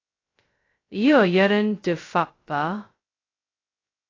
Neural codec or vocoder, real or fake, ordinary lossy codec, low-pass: codec, 16 kHz, 0.2 kbps, FocalCodec; fake; AAC, 32 kbps; 7.2 kHz